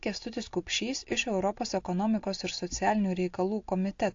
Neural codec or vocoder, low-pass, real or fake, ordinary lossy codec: none; 7.2 kHz; real; AAC, 48 kbps